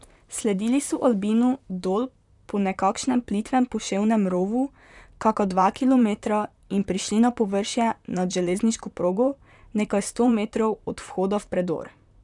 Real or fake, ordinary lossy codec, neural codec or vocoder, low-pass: fake; none; vocoder, 44.1 kHz, 128 mel bands, Pupu-Vocoder; 10.8 kHz